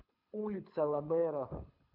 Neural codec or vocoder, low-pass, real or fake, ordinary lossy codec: codec, 24 kHz, 6 kbps, HILCodec; 5.4 kHz; fake; none